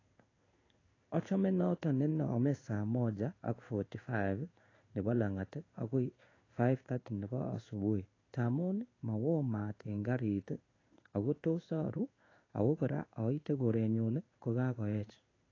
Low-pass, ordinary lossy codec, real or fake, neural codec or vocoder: 7.2 kHz; AAC, 32 kbps; fake; codec, 16 kHz in and 24 kHz out, 1 kbps, XY-Tokenizer